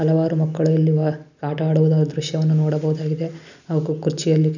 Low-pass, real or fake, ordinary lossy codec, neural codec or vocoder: 7.2 kHz; real; none; none